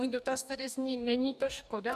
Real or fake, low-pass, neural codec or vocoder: fake; 14.4 kHz; codec, 44.1 kHz, 2.6 kbps, DAC